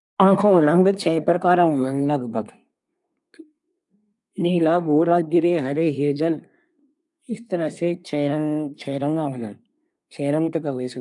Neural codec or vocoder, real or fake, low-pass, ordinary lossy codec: codec, 24 kHz, 1 kbps, SNAC; fake; 10.8 kHz; none